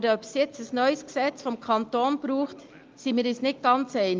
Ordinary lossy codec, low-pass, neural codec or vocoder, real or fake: Opus, 32 kbps; 7.2 kHz; none; real